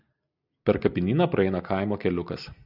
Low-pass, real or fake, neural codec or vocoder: 5.4 kHz; real; none